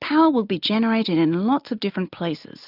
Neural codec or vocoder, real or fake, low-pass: none; real; 5.4 kHz